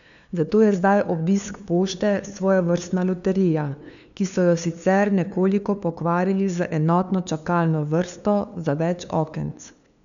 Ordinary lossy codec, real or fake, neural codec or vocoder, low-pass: none; fake; codec, 16 kHz, 2 kbps, FunCodec, trained on LibriTTS, 25 frames a second; 7.2 kHz